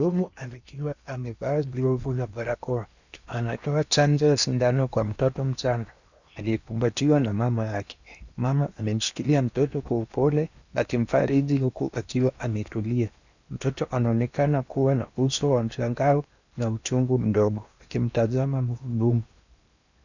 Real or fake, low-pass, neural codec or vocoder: fake; 7.2 kHz; codec, 16 kHz in and 24 kHz out, 0.8 kbps, FocalCodec, streaming, 65536 codes